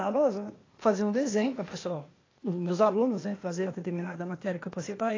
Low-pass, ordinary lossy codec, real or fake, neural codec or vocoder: 7.2 kHz; AAC, 32 kbps; fake; codec, 16 kHz, 0.8 kbps, ZipCodec